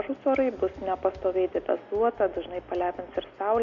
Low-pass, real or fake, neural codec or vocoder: 7.2 kHz; real; none